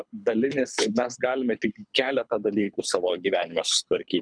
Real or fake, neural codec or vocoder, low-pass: fake; codec, 24 kHz, 6 kbps, HILCodec; 9.9 kHz